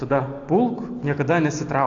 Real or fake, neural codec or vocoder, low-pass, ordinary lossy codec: real; none; 7.2 kHz; AAC, 48 kbps